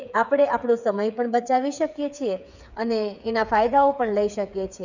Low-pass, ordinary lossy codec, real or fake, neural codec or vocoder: 7.2 kHz; none; fake; codec, 16 kHz, 8 kbps, FreqCodec, smaller model